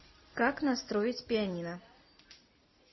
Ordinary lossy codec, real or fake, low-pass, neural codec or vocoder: MP3, 24 kbps; real; 7.2 kHz; none